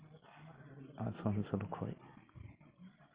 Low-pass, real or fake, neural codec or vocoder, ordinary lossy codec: 3.6 kHz; real; none; Opus, 32 kbps